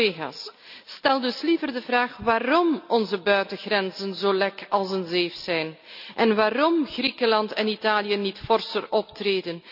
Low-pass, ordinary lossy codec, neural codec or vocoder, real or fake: 5.4 kHz; none; none; real